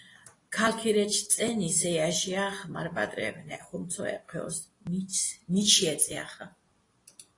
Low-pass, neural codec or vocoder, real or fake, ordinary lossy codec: 10.8 kHz; none; real; AAC, 32 kbps